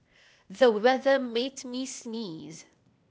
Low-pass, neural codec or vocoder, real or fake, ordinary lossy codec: none; codec, 16 kHz, 0.8 kbps, ZipCodec; fake; none